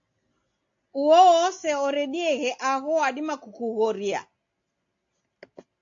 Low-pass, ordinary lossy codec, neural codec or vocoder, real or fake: 7.2 kHz; AAC, 48 kbps; none; real